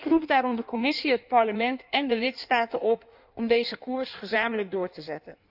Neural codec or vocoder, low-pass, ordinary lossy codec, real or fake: codec, 16 kHz in and 24 kHz out, 1.1 kbps, FireRedTTS-2 codec; 5.4 kHz; none; fake